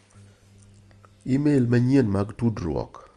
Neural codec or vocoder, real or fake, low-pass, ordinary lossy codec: none; real; 10.8 kHz; Opus, 32 kbps